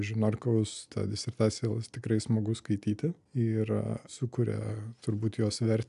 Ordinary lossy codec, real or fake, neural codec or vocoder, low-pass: AAC, 96 kbps; real; none; 10.8 kHz